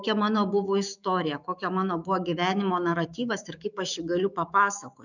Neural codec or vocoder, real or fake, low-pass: autoencoder, 48 kHz, 128 numbers a frame, DAC-VAE, trained on Japanese speech; fake; 7.2 kHz